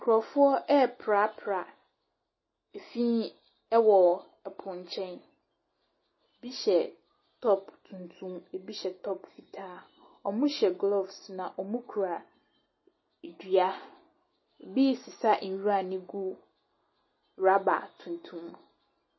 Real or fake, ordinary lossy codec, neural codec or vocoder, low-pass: real; MP3, 24 kbps; none; 7.2 kHz